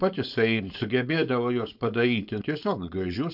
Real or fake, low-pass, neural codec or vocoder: fake; 5.4 kHz; codec, 16 kHz, 4.8 kbps, FACodec